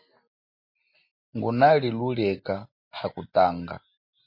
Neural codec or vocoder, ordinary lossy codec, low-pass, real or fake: none; MP3, 32 kbps; 5.4 kHz; real